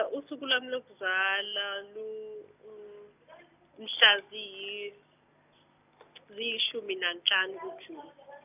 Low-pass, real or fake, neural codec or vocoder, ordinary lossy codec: 3.6 kHz; real; none; none